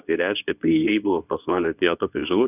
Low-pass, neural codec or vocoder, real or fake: 3.6 kHz; codec, 24 kHz, 0.9 kbps, WavTokenizer, medium speech release version 2; fake